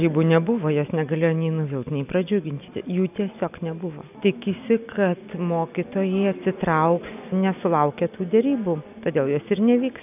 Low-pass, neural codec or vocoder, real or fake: 3.6 kHz; none; real